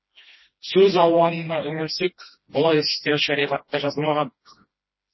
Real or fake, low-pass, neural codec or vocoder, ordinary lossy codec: fake; 7.2 kHz; codec, 16 kHz, 1 kbps, FreqCodec, smaller model; MP3, 24 kbps